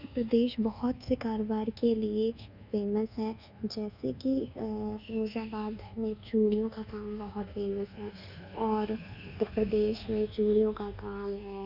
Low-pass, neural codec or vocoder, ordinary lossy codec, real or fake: 5.4 kHz; codec, 24 kHz, 1.2 kbps, DualCodec; none; fake